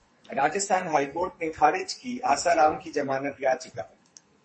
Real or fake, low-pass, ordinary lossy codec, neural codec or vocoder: fake; 10.8 kHz; MP3, 32 kbps; codec, 44.1 kHz, 2.6 kbps, SNAC